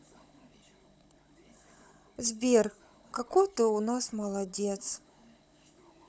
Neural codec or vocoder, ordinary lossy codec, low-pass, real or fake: codec, 16 kHz, 16 kbps, FunCodec, trained on Chinese and English, 50 frames a second; none; none; fake